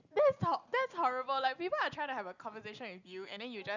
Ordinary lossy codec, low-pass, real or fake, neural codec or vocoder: none; 7.2 kHz; real; none